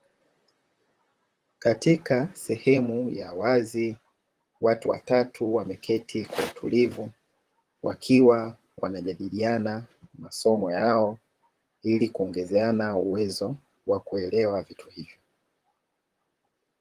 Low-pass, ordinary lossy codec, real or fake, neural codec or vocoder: 14.4 kHz; Opus, 24 kbps; fake; vocoder, 44.1 kHz, 128 mel bands, Pupu-Vocoder